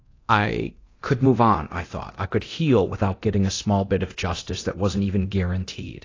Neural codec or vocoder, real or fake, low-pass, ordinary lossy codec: codec, 24 kHz, 0.9 kbps, DualCodec; fake; 7.2 kHz; AAC, 32 kbps